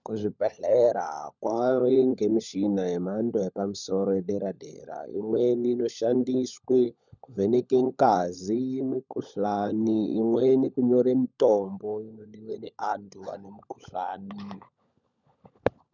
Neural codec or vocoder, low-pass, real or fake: codec, 16 kHz, 16 kbps, FunCodec, trained on LibriTTS, 50 frames a second; 7.2 kHz; fake